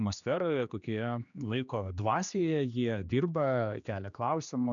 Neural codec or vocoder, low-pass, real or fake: codec, 16 kHz, 2 kbps, X-Codec, HuBERT features, trained on general audio; 7.2 kHz; fake